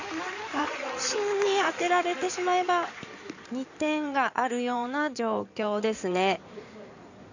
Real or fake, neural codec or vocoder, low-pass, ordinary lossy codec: fake; codec, 16 kHz in and 24 kHz out, 2.2 kbps, FireRedTTS-2 codec; 7.2 kHz; none